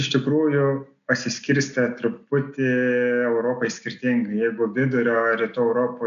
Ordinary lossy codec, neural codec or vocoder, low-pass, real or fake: AAC, 96 kbps; none; 7.2 kHz; real